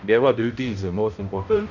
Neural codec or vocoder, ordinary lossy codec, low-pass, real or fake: codec, 16 kHz, 0.5 kbps, X-Codec, HuBERT features, trained on balanced general audio; none; 7.2 kHz; fake